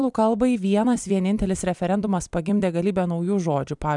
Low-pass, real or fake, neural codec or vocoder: 10.8 kHz; fake; vocoder, 44.1 kHz, 128 mel bands every 256 samples, BigVGAN v2